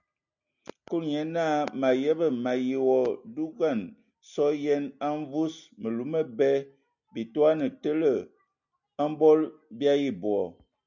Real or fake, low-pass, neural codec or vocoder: real; 7.2 kHz; none